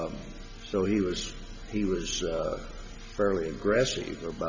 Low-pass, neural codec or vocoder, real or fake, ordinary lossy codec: 7.2 kHz; none; real; MP3, 64 kbps